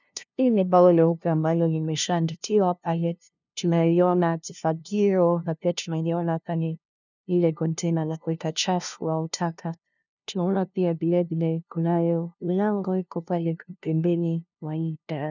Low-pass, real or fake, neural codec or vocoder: 7.2 kHz; fake; codec, 16 kHz, 0.5 kbps, FunCodec, trained on LibriTTS, 25 frames a second